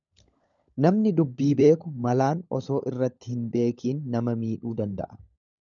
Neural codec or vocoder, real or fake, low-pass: codec, 16 kHz, 16 kbps, FunCodec, trained on LibriTTS, 50 frames a second; fake; 7.2 kHz